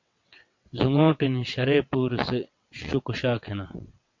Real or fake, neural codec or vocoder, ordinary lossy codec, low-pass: fake; vocoder, 22.05 kHz, 80 mel bands, WaveNeXt; MP3, 48 kbps; 7.2 kHz